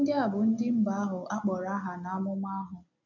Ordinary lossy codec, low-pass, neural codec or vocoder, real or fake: none; 7.2 kHz; none; real